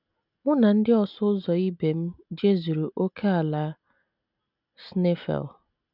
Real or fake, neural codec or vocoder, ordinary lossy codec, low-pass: real; none; none; 5.4 kHz